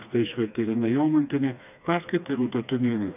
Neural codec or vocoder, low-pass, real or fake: codec, 16 kHz, 2 kbps, FreqCodec, smaller model; 3.6 kHz; fake